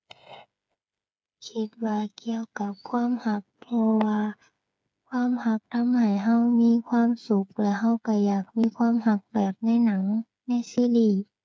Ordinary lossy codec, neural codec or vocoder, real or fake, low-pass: none; codec, 16 kHz, 8 kbps, FreqCodec, smaller model; fake; none